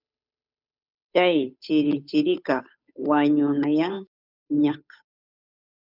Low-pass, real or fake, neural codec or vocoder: 5.4 kHz; fake; codec, 16 kHz, 8 kbps, FunCodec, trained on Chinese and English, 25 frames a second